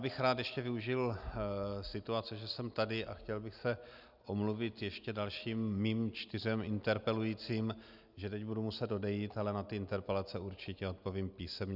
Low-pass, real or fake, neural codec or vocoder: 5.4 kHz; real; none